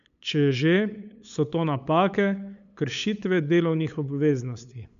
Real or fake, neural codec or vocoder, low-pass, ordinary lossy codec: fake; codec, 16 kHz, 8 kbps, FunCodec, trained on LibriTTS, 25 frames a second; 7.2 kHz; none